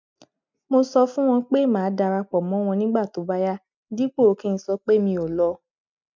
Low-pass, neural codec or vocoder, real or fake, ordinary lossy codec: 7.2 kHz; none; real; MP3, 64 kbps